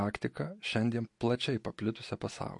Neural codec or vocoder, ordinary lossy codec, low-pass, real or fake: none; MP3, 48 kbps; 10.8 kHz; real